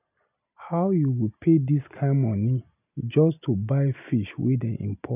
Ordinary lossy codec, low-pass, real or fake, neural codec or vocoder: none; 3.6 kHz; real; none